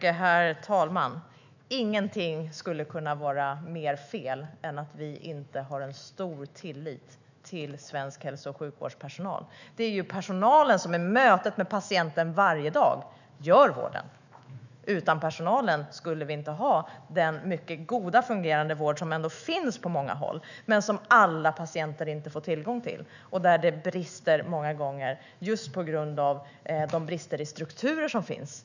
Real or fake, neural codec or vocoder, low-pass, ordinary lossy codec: fake; autoencoder, 48 kHz, 128 numbers a frame, DAC-VAE, trained on Japanese speech; 7.2 kHz; none